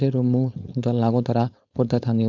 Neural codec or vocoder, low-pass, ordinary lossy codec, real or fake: codec, 16 kHz, 4.8 kbps, FACodec; 7.2 kHz; none; fake